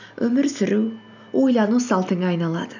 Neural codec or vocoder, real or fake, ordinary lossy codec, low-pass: none; real; none; 7.2 kHz